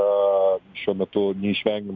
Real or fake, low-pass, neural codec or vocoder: real; 7.2 kHz; none